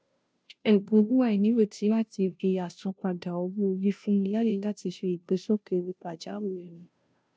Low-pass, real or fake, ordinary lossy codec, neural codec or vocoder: none; fake; none; codec, 16 kHz, 0.5 kbps, FunCodec, trained on Chinese and English, 25 frames a second